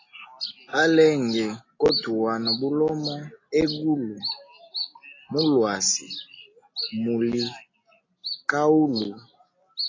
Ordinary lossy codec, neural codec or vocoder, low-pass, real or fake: AAC, 32 kbps; none; 7.2 kHz; real